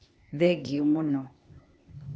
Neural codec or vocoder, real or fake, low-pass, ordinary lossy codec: codec, 16 kHz, 0.8 kbps, ZipCodec; fake; none; none